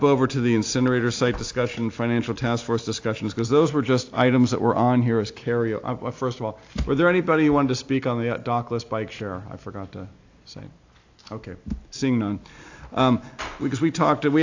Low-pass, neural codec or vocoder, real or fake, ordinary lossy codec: 7.2 kHz; none; real; AAC, 48 kbps